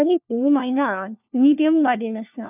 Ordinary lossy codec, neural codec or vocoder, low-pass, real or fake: none; codec, 16 kHz, 1 kbps, FunCodec, trained on LibriTTS, 50 frames a second; 3.6 kHz; fake